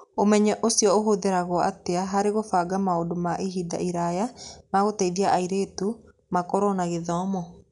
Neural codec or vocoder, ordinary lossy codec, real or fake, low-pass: none; none; real; 10.8 kHz